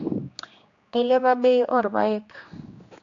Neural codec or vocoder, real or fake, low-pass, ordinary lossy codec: codec, 16 kHz, 2 kbps, X-Codec, HuBERT features, trained on general audio; fake; 7.2 kHz; AAC, 48 kbps